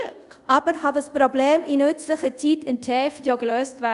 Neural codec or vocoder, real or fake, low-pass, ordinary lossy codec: codec, 24 kHz, 0.5 kbps, DualCodec; fake; 10.8 kHz; none